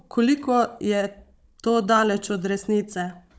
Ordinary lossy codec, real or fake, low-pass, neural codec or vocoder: none; fake; none; codec, 16 kHz, 16 kbps, FunCodec, trained on Chinese and English, 50 frames a second